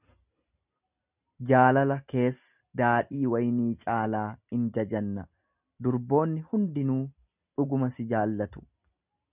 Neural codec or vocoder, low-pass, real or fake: none; 3.6 kHz; real